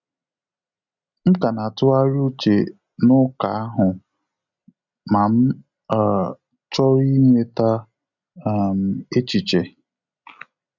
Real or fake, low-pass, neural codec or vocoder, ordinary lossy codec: real; 7.2 kHz; none; none